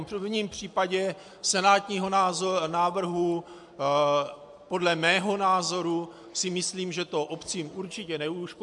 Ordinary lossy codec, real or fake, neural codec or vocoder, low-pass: MP3, 64 kbps; real; none; 10.8 kHz